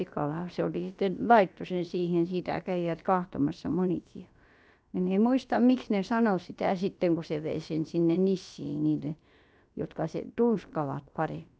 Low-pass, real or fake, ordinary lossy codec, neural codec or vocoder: none; fake; none; codec, 16 kHz, about 1 kbps, DyCAST, with the encoder's durations